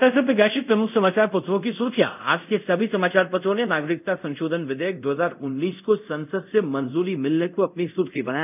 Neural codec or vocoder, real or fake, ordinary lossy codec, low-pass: codec, 24 kHz, 0.5 kbps, DualCodec; fake; none; 3.6 kHz